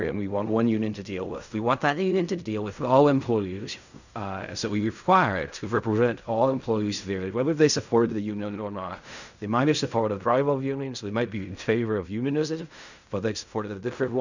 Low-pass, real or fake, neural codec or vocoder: 7.2 kHz; fake; codec, 16 kHz in and 24 kHz out, 0.4 kbps, LongCat-Audio-Codec, fine tuned four codebook decoder